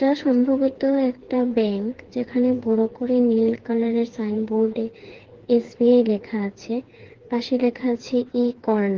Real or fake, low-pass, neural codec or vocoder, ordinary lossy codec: fake; 7.2 kHz; codec, 16 kHz, 4 kbps, FreqCodec, smaller model; Opus, 16 kbps